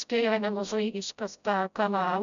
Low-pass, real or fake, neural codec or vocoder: 7.2 kHz; fake; codec, 16 kHz, 0.5 kbps, FreqCodec, smaller model